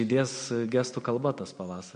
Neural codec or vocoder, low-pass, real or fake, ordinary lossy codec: none; 9.9 kHz; real; MP3, 48 kbps